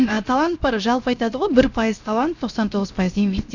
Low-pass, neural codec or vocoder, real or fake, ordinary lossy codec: 7.2 kHz; codec, 16 kHz, 0.7 kbps, FocalCodec; fake; none